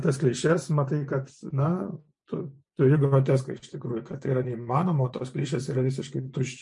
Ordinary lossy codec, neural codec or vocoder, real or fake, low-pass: MP3, 48 kbps; vocoder, 24 kHz, 100 mel bands, Vocos; fake; 10.8 kHz